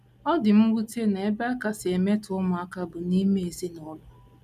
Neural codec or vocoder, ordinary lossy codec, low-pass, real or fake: none; none; 14.4 kHz; real